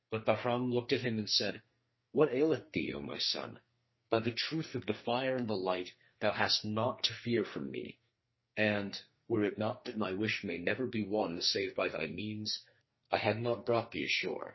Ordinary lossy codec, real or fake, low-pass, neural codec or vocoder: MP3, 24 kbps; fake; 7.2 kHz; codec, 44.1 kHz, 2.6 kbps, SNAC